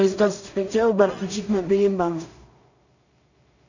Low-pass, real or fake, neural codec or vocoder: 7.2 kHz; fake; codec, 16 kHz in and 24 kHz out, 0.4 kbps, LongCat-Audio-Codec, two codebook decoder